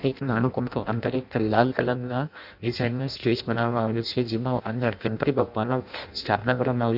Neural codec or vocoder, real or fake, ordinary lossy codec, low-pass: codec, 16 kHz in and 24 kHz out, 0.6 kbps, FireRedTTS-2 codec; fake; none; 5.4 kHz